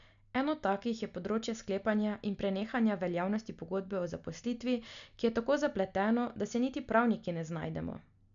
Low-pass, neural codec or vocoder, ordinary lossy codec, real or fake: 7.2 kHz; none; none; real